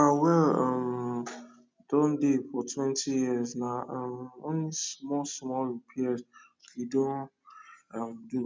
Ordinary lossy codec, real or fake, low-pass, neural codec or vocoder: none; real; none; none